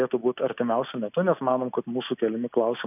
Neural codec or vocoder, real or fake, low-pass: none; real; 3.6 kHz